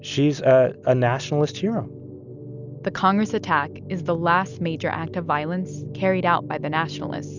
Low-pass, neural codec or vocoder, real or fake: 7.2 kHz; none; real